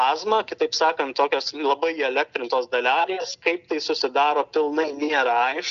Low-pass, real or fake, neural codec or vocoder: 7.2 kHz; real; none